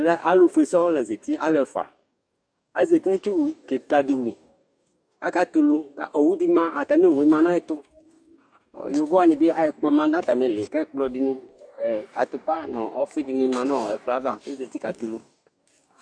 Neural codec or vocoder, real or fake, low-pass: codec, 44.1 kHz, 2.6 kbps, DAC; fake; 9.9 kHz